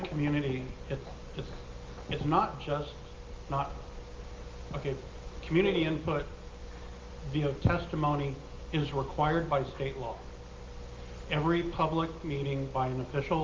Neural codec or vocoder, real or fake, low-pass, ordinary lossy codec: none; real; 7.2 kHz; Opus, 32 kbps